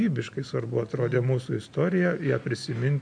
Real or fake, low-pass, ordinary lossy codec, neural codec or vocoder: real; 9.9 kHz; MP3, 64 kbps; none